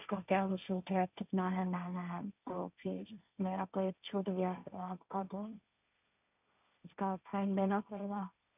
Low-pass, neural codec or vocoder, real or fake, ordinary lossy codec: 3.6 kHz; codec, 16 kHz, 1.1 kbps, Voila-Tokenizer; fake; none